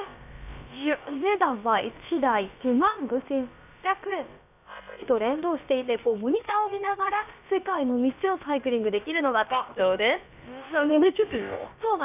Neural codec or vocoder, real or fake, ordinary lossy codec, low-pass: codec, 16 kHz, about 1 kbps, DyCAST, with the encoder's durations; fake; none; 3.6 kHz